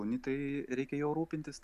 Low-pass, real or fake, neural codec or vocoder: 14.4 kHz; real; none